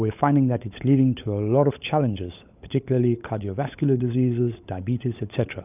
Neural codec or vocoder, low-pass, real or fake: codec, 16 kHz, 16 kbps, FunCodec, trained on LibriTTS, 50 frames a second; 3.6 kHz; fake